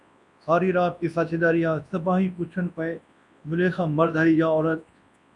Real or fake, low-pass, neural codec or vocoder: fake; 10.8 kHz; codec, 24 kHz, 0.9 kbps, WavTokenizer, large speech release